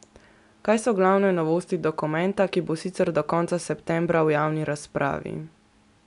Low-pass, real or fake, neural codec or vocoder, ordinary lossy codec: 10.8 kHz; real; none; none